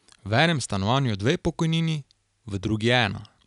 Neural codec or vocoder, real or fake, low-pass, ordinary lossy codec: none; real; 10.8 kHz; none